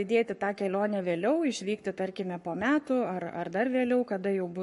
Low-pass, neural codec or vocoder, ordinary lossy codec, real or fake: 14.4 kHz; codec, 44.1 kHz, 7.8 kbps, Pupu-Codec; MP3, 48 kbps; fake